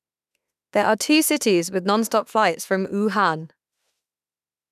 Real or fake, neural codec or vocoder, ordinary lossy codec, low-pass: fake; autoencoder, 48 kHz, 32 numbers a frame, DAC-VAE, trained on Japanese speech; none; 14.4 kHz